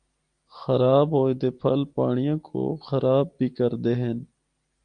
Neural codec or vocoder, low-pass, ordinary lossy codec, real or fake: none; 9.9 kHz; Opus, 32 kbps; real